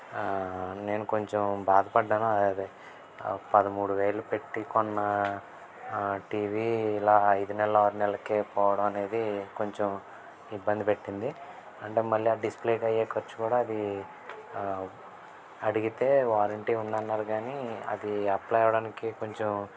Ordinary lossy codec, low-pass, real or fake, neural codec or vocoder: none; none; real; none